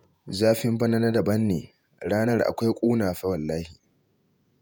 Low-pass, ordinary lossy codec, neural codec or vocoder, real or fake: none; none; vocoder, 48 kHz, 128 mel bands, Vocos; fake